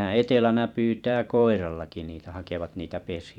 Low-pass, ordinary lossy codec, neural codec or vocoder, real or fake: 19.8 kHz; none; vocoder, 44.1 kHz, 128 mel bands every 512 samples, BigVGAN v2; fake